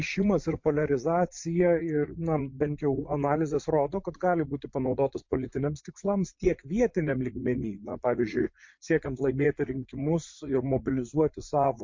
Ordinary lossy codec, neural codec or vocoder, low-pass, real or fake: MP3, 48 kbps; vocoder, 44.1 kHz, 80 mel bands, Vocos; 7.2 kHz; fake